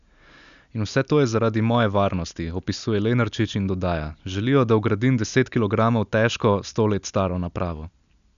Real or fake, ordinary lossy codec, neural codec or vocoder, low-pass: real; none; none; 7.2 kHz